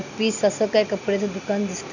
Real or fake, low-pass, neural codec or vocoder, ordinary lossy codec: real; 7.2 kHz; none; none